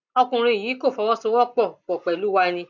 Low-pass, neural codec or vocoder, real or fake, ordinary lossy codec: 7.2 kHz; none; real; none